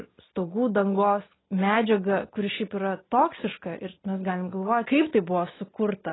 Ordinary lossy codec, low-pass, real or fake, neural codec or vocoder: AAC, 16 kbps; 7.2 kHz; real; none